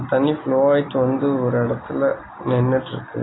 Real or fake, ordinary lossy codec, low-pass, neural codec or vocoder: real; AAC, 16 kbps; 7.2 kHz; none